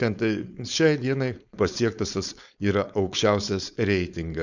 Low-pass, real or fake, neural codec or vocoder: 7.2 kHz; fake; codec, 16 kHz, 4.8 kbps, FACodec